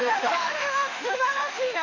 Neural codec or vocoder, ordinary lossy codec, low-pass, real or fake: autoencoder, 48 kHz, 32 numbers a frame, DAC-VAE, trained on Japanese speech; none; 7.2 kHz; fake